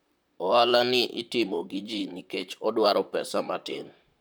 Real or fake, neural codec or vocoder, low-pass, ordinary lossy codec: fake; vocoder, 44.1 kHz, 128 mel bands, Pupu-Vocoder; none; none